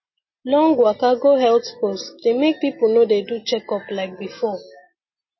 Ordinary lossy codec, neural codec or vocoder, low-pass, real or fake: MP3, 24 kbps; none; 7.2 kHz; real